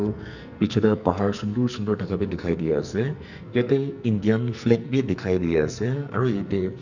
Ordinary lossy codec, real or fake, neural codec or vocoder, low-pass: none; fake; codec, 44.1 kHz, 2.6 kbps, SNAC; 7.2 kHz